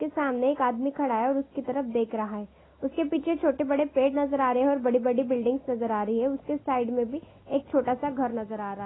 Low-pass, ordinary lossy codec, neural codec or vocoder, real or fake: 7.2 kHz; AAC, 16 kbps; none; real